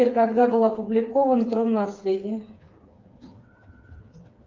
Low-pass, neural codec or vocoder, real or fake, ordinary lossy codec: 7.2 kHz; codec, 16 kHz, 4 kbps, FreqCodec, smaller model; fake; Opus, 24 kbps